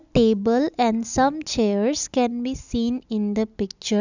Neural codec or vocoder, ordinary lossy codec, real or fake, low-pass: none; none; real; 7.2 kHz